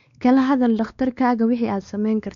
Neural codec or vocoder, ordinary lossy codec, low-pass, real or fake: codec, 16 kHz, 2 kbps, X-Codec, WavLM features, trained on Multilingual LibriSpeech; none; 7.2 kHz; fake